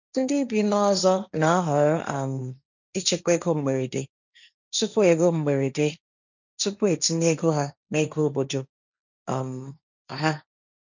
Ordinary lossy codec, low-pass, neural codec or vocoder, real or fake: none; 7.2 kHz; codec, 16 kHz, 1.1 kbps, Voila-Tokenizer; fake